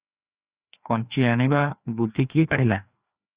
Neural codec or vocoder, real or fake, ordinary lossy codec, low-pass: codec, 16 kHz, 2 kbps, FreqCodec, larger model; fake; Opus, 64 kbps; 3.6 kHz